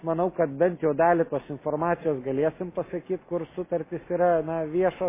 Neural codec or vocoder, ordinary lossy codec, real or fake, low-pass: none; MP3, 16 kbps; real; 3.6 kHz